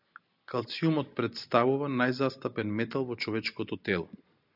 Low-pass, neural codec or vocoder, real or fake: 5.4 kHz; none; real